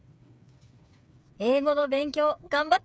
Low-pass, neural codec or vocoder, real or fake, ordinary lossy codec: none; codec, 16 kHz, 8 kbps, FreqCodec, smaller model; fake; none